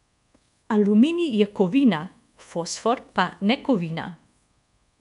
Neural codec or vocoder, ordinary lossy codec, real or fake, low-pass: codec, 24 kHz, 1.2 kbps, DualCodec; none; fake; 10.8 kHz